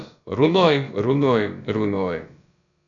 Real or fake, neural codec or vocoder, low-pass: fake; codec, 16 kHz, about 1 kbps, DyCAST, with the encoder's durations; 7.2 kHz